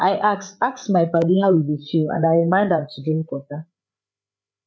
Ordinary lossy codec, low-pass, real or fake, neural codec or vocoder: none; none; fake; codec, 16 kHz, 8 kbps, FreqCodec, larger model